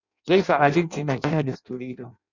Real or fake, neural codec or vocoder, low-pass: fake; codec, 16 kHz in and 24 kHz out, 0.6 kbps, FireRedTTS-2 codec; 7.2 kHz